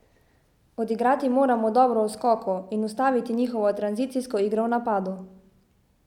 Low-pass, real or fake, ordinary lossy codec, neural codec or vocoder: 19.8 kHz; real; none; none